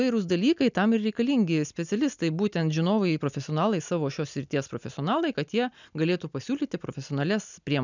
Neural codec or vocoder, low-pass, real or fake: none; 7.2 kHz; real